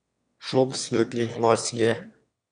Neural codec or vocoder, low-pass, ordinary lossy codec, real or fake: autoencoder, 22.05 kHz, a latent of 192 numbers a frame, VITS, trained on one speaker; 9.9 kHz; none; fake